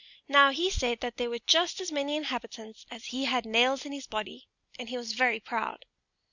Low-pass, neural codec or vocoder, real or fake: 7.2 kHz; none; real